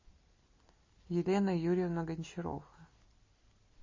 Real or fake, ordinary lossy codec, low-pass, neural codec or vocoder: real; MP3, 32 kbps; 7.2 kHz; none